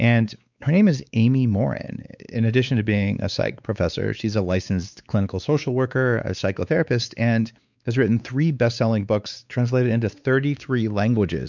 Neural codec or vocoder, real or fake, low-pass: codec, 16 kHz, 4 kbps, X-Codec, WavLM features, trained on Multilingual LibriSpeech; fake; 7.2 kHz